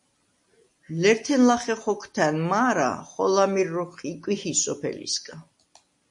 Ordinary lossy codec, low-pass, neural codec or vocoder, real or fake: MP3, 48 kbps; 10.8 kHz; none; real